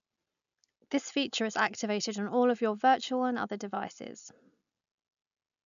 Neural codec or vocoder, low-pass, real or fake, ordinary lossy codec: none; 7.2 kHz; real; none